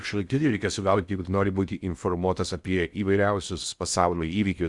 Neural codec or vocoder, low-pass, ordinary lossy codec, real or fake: codec, 16 kHz in and 24 kHz out, 0.6 kbps, FocalCodec, streaming, 2048 codes; 10.8 kHz; Opus, 64 kbps; fake